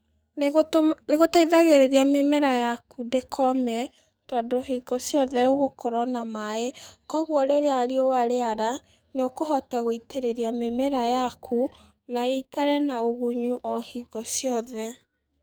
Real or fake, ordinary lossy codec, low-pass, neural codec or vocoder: fake; none; none; codec, 44.1 kHz, 2.6 kbps, SNAC